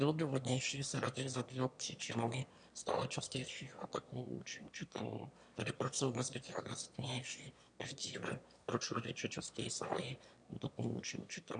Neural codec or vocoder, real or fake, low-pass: autoencoder, 22.05 kHz, a latent of 192 numbers a frame, VITS, trained on one speaker; fake; 9.9 kHz